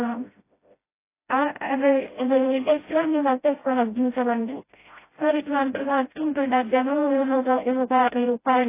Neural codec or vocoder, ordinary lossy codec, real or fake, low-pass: codec, 16 kHz, 0.5 kbps, FreqCodec, smaller model; AAC, 24 kbps; fake; 3.6 kHz